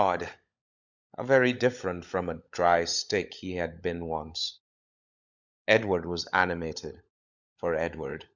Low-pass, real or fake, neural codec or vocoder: 7.2 kHz; fake; codec, 16 kHz, 16 kbps, FunCodec, trained on LibriTTS, 50 frames a second